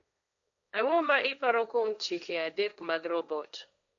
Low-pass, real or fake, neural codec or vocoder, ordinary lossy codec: 7.2 kHz; fake; codec, 16 kHz, 1.1 kbps, Voila-Tokenizer; none